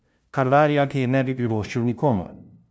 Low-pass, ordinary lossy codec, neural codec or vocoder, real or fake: none; none; codec, 16 kHz, 0.5 kbps, FunCodec, trained on LibriTTS, 25 frames a second; fake